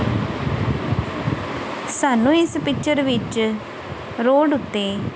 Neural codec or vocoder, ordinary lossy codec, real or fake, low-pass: none; none; real; none